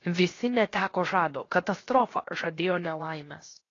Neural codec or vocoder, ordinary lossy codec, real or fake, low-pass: codec, 16 kHz, 0.7 kbps, FocalCodec; AAC, 32 kbps; fake; 7.2 kHz